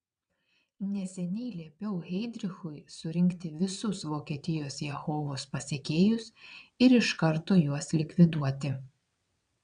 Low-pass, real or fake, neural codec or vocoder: 9.9 kHz; fake; vocoder, 22.05 kHz, 80 mel bands, WaveNeXt